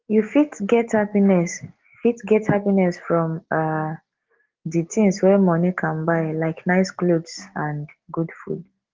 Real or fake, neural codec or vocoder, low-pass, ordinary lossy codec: real; none; 7.2 kHz; Opus, 16 kbps